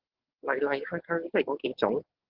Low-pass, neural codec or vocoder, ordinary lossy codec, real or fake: 5.4 kHz; codec, 44.1 kHz, 7.8 kbps, DAC; Opus, 16 kbps; fake